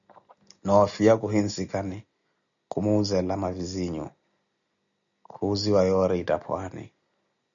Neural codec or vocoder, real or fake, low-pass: none; real; 7.2 kHz